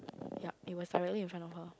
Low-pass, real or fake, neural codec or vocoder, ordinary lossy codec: none; real; none; none